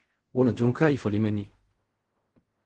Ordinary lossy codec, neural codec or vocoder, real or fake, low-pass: Opus, 16 kbps; codec, 16 kHz in and 24 kHz out, 0.4 kbps, LongCat-Audio-Codec, fine tuned four codebook decoder; fake; 10.8 kHz